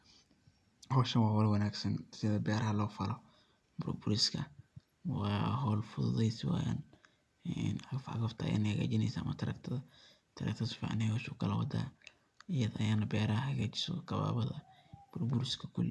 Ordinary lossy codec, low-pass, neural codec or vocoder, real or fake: none; none; none; real